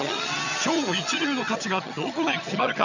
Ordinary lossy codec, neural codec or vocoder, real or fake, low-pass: none; vocoder, 22.05 kHz, 80 mel bands, HiFi-GAN; fake; 7.2 kHz